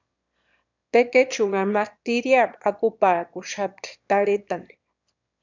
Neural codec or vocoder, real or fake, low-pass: autoencoder, 22.05 kHz, a latent of 192 numbers a frame, VITS, trained on one speaker; fake; 7.2 kHz